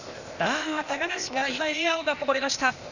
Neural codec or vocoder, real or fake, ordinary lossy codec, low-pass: codec, 16 kHz, 0.8 kbps, ZipCodec; fake; none; 7.2 kHz